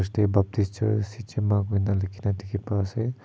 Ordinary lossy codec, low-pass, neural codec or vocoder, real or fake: none; none; none; real